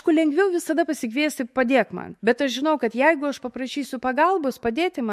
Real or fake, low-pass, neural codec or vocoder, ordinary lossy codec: fake; 14.4 kHz; autoencoder, 48 kHz, 128 numbers a frame, DAC-VAE, trained on Japanese speech; MP3, 64 kbps